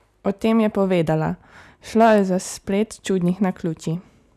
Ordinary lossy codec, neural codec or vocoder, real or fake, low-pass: none; none; real; 14.4 kHz